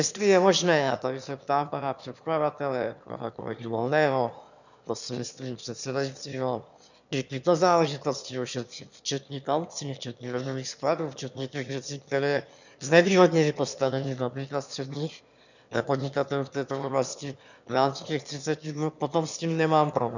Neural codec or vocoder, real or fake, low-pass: autoencoder, 22.05 kHz, a latent of 192 numbers a frame, VITS, trained on one speaker; fake; 7.2 kHz